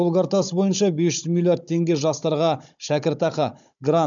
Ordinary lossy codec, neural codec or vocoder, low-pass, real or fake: none; codec, 16 kHz, 16 kbps, FunCodec, trained on Chinese and English, 50 frames a second; 7.2 kHz; fake